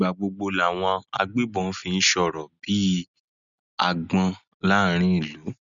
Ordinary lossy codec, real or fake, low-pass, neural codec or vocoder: none; real; 7.2 kHz; none